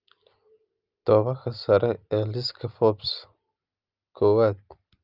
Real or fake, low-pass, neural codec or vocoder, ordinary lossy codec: real; 5.4 kHz; none; Opus, 24 kbps